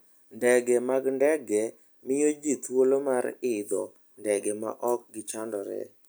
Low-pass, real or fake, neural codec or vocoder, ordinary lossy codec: none; real; none; none